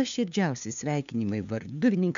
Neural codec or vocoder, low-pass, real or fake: codec, 16 kHz, 2 kbps, FunCodec, trained on LibriTTS, 25 frames a second; 7.2 kHz; fake